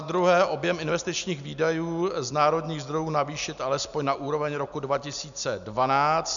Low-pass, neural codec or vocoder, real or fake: 7.2 kHz; none; real